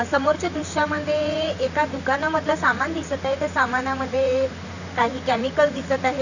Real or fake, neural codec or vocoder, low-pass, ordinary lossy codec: fake; vocoder, 44.1 kHz, 128 mel bands, Pupu-Vocoder; 7.2 kHz; none